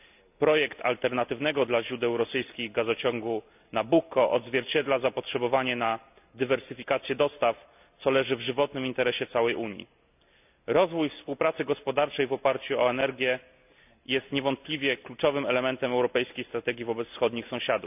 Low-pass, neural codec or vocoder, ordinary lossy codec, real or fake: 3.6 kHz; none; none; real